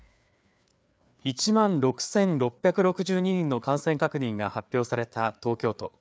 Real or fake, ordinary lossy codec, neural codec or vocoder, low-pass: fake; none; codec, 16 kHz, 4 kbps, FreqCodec, larger model; none